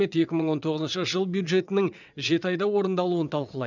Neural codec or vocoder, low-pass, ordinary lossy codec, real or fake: vocoder, 22.05 kHz, 80 mel bands, WaveNeXt; 7.2 kHz; none; fake